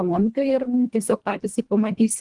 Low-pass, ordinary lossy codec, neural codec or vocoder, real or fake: 10.8 kHz; Opus, 24 kbps; codec, 24 kHz, 1.5 kbps, HILCodec; fake